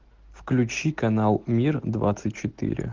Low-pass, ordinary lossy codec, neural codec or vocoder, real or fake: 7.2 kHz; Opus, 16 kbps; none; real